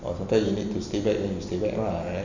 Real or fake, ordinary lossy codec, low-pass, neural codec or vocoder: real; none; 7.2 kHz; none